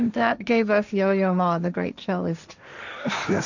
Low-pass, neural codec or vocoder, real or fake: 7.2 kHz; codec, 16 kHz, 1.1 kbps, Voila-Tokenizer; fake